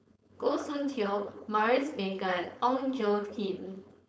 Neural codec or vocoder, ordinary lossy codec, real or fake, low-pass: codec, 16 kHz, 4.8 kbps, FACodec; none; fake; none